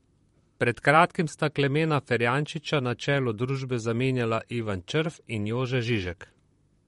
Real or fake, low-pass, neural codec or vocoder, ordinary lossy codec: fake; 19.8 kHz; vocoder, 44.1 kHz, 128 mel bands, Pupu-Vocoder; MP3, 48 kbps